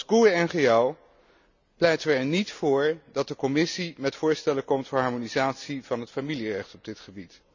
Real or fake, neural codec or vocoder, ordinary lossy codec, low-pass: real; none; none; 7.2 kHz